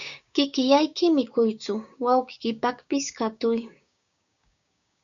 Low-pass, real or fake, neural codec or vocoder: 7.2 kHz; fake; codec, 16 kHz, 6 kbps, DAC